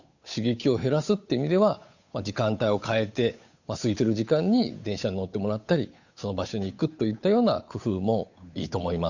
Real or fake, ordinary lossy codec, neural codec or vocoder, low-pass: fake; none; codec, 16 kHz, 8 kbps, FunCodec, trained on Chinese and English, 25 frames a second; 7.2 kHz